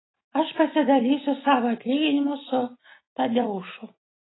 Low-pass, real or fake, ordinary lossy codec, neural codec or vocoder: 7.2 kHz; real; AAC, 16 kbps; none